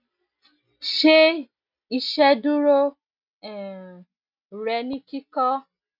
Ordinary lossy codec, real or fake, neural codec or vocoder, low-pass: none; real; none; 5.4 kHz